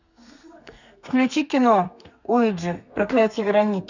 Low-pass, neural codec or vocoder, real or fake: 7.2 kHz; codec, 32 kHz, 1.9 kbps, SNAC; fake